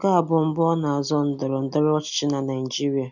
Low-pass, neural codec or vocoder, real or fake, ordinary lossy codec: 7.2 kHz; none; real; none